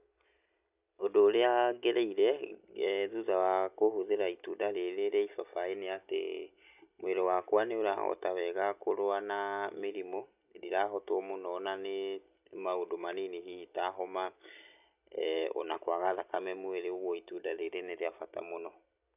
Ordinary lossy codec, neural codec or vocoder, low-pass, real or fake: none; none; 3.6 kHz; real